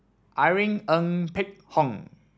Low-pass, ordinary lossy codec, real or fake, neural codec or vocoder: none; none; real; none